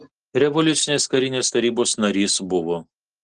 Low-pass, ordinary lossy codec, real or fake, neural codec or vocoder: 10.8 kHz; Opus, 16 kbps; real; none